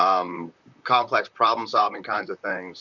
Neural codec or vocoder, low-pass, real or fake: vocoder, 44.1 kHz, 128 mel bands, Pupu-Vocoder; 7.2 kHz; fake